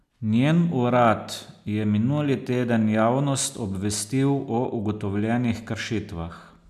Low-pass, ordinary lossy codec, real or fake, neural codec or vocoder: 14.4 kHz; none; real; none